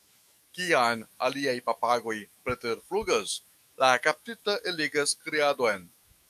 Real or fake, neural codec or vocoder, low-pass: fake; autoencoder, 48 kHz, 128 numbers a frame, DAC-VAE, trained on Japanese speech; 14.4 kHz